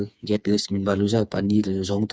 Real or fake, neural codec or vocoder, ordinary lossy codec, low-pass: fake; codec, 16 kHz, 4 kbps, FreqCodec, smaller model; none; none